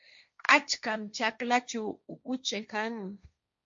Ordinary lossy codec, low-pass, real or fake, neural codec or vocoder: MP3, 48 kbps; 7.2 kHz; fake; codec, 16 kHz, 1.1 kbps, Voila-Tokenizer